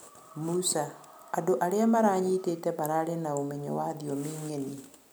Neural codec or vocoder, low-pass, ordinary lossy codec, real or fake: none; none; none; real